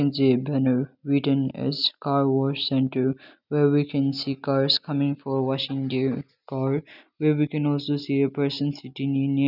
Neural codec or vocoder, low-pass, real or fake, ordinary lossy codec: none; 5.4 kHz; real; none